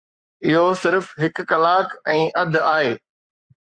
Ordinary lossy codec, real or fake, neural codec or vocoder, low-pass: Opus, 64 kbps; fake; codec, 44.1 kHz, 7.8 kbps, Pupu-Codec; 9.9 kHz